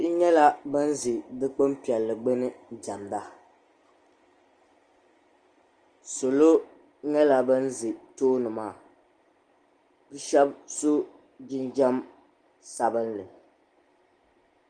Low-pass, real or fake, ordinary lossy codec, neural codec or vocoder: 9.9 kHz; real; Opus, 24 kbps; none